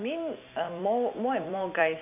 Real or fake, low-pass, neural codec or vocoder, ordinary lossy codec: fake; 3.6 kHz; vocoder, 44.1 kHz, 128 mel bands every 256 samples, BigVGAN v2; none